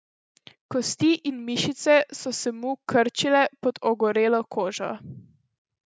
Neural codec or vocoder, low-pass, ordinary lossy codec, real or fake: none; none; none; real